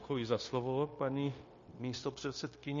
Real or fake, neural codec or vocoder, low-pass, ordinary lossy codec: fake; codec, 16 kHz, 0.9 kbps, LongCat-Audio-Codec; 7.2 kHz; MP3, 32 kbps